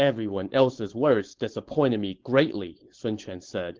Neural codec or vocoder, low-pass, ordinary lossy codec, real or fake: codec, 16 kHz, 4.8 kbps, FACodec; 7.2 kHz; Opus, 16 kbps; fake